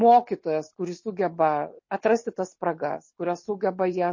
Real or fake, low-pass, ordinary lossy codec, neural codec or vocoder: real; 7.2 kHz; MP3, 32 kbps; none